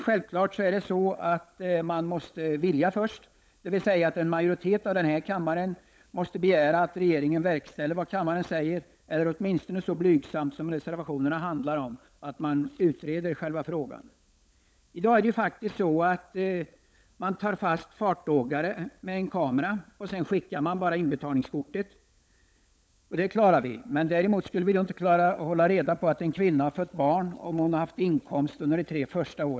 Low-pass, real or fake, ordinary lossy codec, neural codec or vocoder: none; fake; none; codec, 16 kHz, 16 kbps, FunCodec, trained on LibriTTS, 50 frames a second